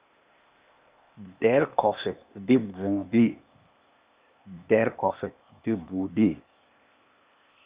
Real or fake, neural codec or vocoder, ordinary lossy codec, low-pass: fake; codec, 16 kHz, 0.8 kbps, ZipCodec; Opus, 64 kbps; 3.6 kHz